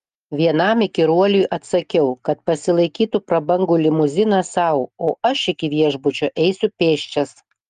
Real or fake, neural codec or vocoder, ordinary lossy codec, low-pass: real; none; Opus, 32 kbps; 10.8 kHz